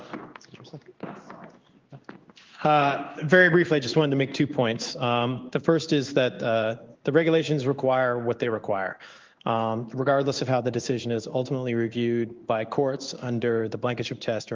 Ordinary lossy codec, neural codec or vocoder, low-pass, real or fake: Opus, 32 kbps; codec, 16 kHz in and 24 kHz out, 1 kbps, XY-Tokenizer; 7.2 kHz; fake